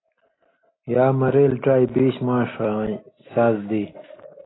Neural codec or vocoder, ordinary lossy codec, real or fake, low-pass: none; AAC, 16 kbps; real; 7.2 kHz